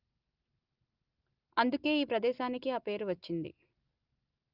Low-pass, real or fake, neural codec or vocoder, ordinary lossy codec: 5.4 kHz; real; none; Opus, 32 kbps